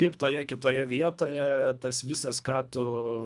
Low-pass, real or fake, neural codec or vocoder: 10.8 kHz; fake; codec, 24 kHz, 1.5 kbps, HILCodec